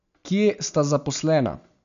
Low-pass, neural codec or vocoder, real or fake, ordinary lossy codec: 7.2 kHz; none; real; none